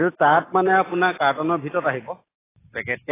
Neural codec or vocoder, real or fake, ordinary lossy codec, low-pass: none; real; AAC, 16 kbps; 3.6 kHz